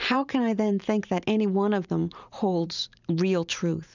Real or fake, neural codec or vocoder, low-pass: fake; vocoder, 44.1 kHz, 128 mel bands every 512 samples, BigVGAN v2; 7.2 kHz